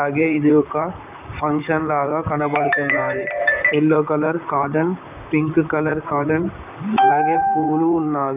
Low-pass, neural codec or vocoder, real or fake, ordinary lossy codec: 3.6 kHz; vocoder, 44.1 kHz, 128 mel bands, Pupu-Vocoder; fake; none